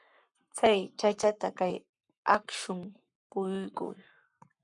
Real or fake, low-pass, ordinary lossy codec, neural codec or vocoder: fake; 10.8 kHz; MP3, 96 kbps; codec, 44.1 kHz, 7.8 kbps, Pupu-Codec